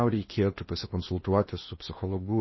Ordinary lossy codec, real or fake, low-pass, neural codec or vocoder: MP3, 24 kbps; fake; 7.2 kHz; codec, 16 kHz in and 24 kHz out, 0.8 kbps, FocalCodec, streaming, 65536 codes